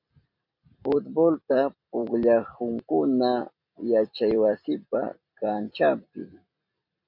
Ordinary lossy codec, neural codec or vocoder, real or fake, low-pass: MP3, 48 kbps; vocoder, 44.1 kHz, 128 mel bands every 256 samples, BigVGAN v2; fake; 5.4 kHz